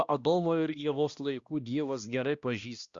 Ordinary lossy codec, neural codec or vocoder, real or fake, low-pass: Opus, 64 kbps; codec, 16 kHz, 1 kbps, X-Codec, HuBERT features, trained on balanced general audio; fake; 7.2 kHz